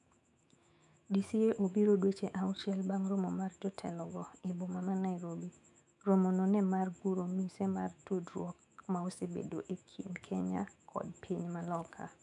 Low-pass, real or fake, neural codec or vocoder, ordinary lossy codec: 10.8 kHz; fake; autoencoder, 48 kHz, 128 numbers a frame, DAC-VAE, trained on Japanese speech; none